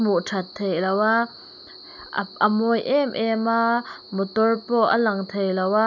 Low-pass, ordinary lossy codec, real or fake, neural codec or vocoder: 7.2 kHz; none; real; none